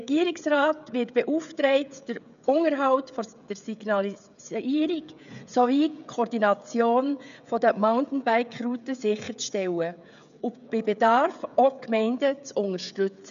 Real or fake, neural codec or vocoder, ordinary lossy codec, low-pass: fake; codec, 16 kHz, 16 kbps, FreqCodec, smaller model; none; 7.2 kHz